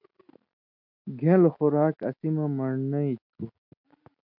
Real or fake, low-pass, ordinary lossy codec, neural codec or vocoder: real; 5.4 kHz; MP3, 48 kbps; none